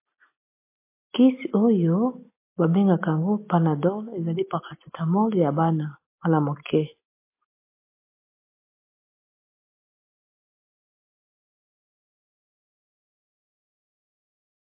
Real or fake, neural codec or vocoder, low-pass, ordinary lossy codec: real; none; 3.6 kHz; MP3, 24 kbps